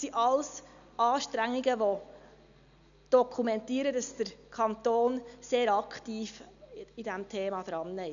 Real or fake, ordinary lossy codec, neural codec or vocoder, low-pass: real; none; none; 7.2 kHz